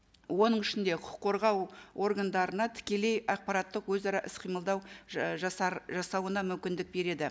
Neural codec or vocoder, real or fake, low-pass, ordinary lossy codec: none; real; none; none